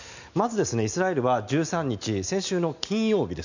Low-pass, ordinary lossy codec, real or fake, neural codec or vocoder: 7.2 kHz; none; real; none